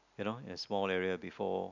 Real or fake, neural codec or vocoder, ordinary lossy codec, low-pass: real; none; none; 7.2 kHz